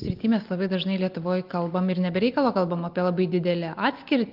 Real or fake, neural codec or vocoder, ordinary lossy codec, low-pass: real; none; Opus, 24 kbps; 5.4 kHz